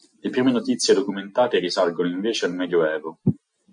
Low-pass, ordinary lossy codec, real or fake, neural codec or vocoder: 9.9 kHz; MP3, 48 kbps; real; none